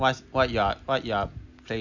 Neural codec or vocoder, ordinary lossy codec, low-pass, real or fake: none; none; 7.2 kHz; real